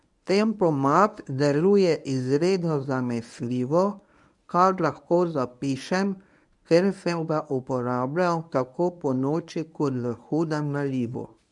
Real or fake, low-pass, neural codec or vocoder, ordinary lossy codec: fake; 10.8 kHz; codec, 24 kHz, 0.9 kbps, WavTokenizer, medium speech release version 1; none